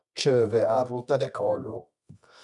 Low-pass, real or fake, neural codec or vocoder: 10.8 kHz; fake; codec, 24 kHz, 0.9 kbps, WavTokenizer, medium music audio release